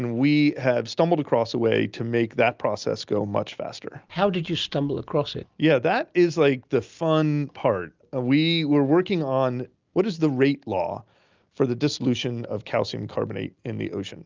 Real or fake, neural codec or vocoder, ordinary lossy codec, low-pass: real; none; Opus, 24 kbps; 7.2 kHz